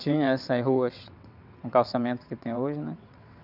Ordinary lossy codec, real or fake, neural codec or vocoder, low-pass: none; fake; vocoder, 22.05 kHz, 80 mel bands, WaveNeXt; 5.4 kHz